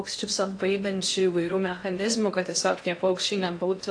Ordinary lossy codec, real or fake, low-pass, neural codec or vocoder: AAC, 48 kbps; fake; 9.9 kHz; codec, 16 kHz in and 24 kHz out, 0.6 kbps, FocalCodec, streaming, 2048 codes